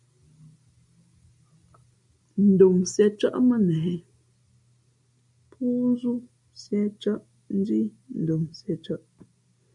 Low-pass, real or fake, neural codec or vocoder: 10.8 kHz; real; none